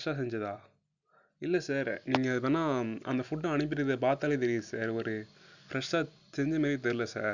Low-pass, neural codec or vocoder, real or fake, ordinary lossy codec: 7.2 kHz; none; real; none